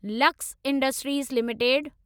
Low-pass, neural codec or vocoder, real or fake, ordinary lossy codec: none; none; real; none